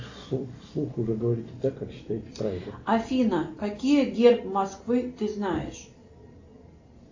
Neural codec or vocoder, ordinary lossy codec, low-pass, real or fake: none; AAC, 48 kbps; 7.2 kHz; real